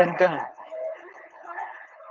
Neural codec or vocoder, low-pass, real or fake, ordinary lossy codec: codec, 16 kHz, 4.8 kbps, FACodec; 7.2 kHz; fake; Opus, 32 kbps